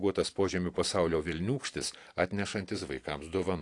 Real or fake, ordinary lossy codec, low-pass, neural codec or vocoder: real; AAC, 48 kbps; 10.8 kHz; none